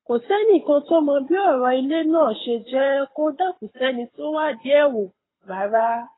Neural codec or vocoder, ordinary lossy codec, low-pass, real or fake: codec, 24 kHz, 6 kbps, HILCodec; AAC, 16 kbps; 7.2 kHz; fake